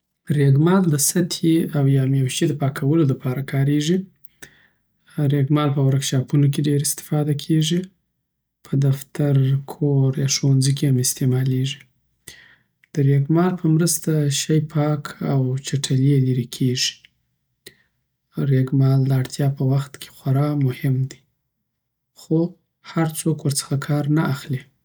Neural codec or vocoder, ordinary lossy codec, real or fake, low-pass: none; none; real; none